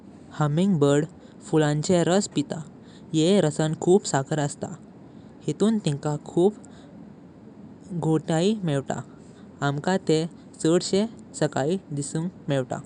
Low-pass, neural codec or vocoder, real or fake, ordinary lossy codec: 10.8 kHz; none; real; none